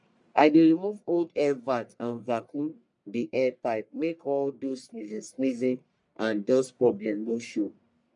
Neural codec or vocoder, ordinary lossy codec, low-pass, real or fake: codec, 44.1 kHz, 1.7 kbps, Pupu-Codec; none; 10.8 kHz; fake